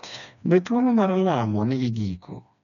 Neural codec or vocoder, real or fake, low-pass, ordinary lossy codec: codec, 16 kHz, 2 kbps, FreqCodec, smaller model; fake; 7.2 kHz; none